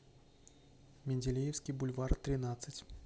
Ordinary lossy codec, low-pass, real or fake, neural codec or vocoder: none; none; real; none